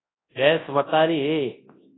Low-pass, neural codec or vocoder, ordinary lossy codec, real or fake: 7.2 kHz; codec, 24 kHz, 0.9 kbps, WavTokenizer, large speech release; AAC, 16 kbps; fake